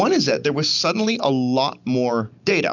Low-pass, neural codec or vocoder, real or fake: 7.2 kHz; none; real